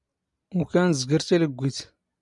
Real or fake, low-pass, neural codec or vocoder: real; 10.8 kHz; none